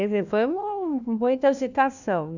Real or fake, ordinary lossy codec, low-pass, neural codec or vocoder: fake; none; 7.2 kHz; codec, 16 kHz, 1 kbps, FunCodec, trained on LibriTTS, 50 frames a second